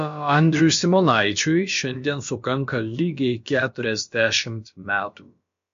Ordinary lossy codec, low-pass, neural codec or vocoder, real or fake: MP3, 48 kbps; 7.2 kHz; codec, 16 kHz, about 1 kbps, DyCAST, with the encoder's durations; fake